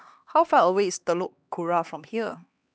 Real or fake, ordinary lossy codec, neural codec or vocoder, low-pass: fake; none; codec, 16 kHz, 2 kbps, X-Codec, HuBERT features, trained on LibriSpeech; none